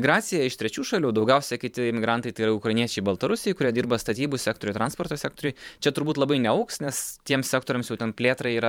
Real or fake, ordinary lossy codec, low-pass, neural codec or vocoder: fake; MP3, 96 kbps; 19.8 kHz; vocoder, 44.1 kHz, 128 mel bands every 512 samples, BigVGAN v2